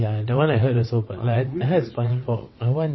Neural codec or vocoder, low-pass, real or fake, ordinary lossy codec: vocoder, 22.05 kHz, 80 mel bands, WaveNeXt; 7.2 kHz; fake; MP3, 24 kbps